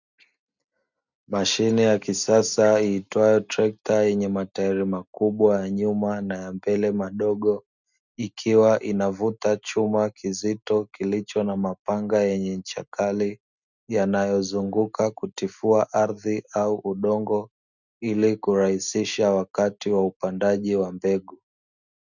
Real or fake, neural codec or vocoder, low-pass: real; none; 7.2 kHz